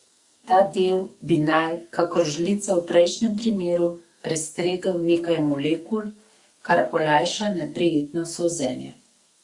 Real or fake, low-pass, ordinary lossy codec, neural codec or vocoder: fake; 10.8 kHz; Opus, 64 kbps; codec, 44.1 kHz, 2.6 kbps, SNAC